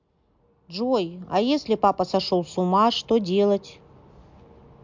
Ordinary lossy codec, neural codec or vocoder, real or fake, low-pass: MP3, 64 kbps; none; real; 7.2 kHz